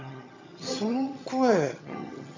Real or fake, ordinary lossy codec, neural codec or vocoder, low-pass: fake; MP3, 64 kbps; vocoder, 22.05 kHz, 80 mel bands, HiFi-GAN; 7.2 kHz